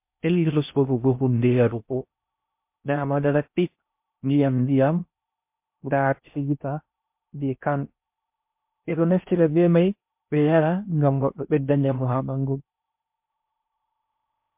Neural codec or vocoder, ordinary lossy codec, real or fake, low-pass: codec, 16 kHz in and 24 kHz out, 0.6 kbps, FocalCodec, streaming, 4096 codes; MP3, 32 kbps; fake; 3.6 kHz